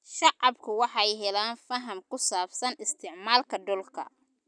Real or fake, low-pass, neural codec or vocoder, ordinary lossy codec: real; 9.9 kHz; none; none